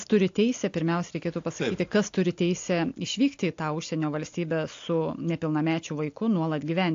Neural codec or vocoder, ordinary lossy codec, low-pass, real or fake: none; AAC, 48 kbps; 7.2 kHz; real